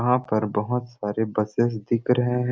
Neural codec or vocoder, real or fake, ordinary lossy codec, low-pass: none; real; none; none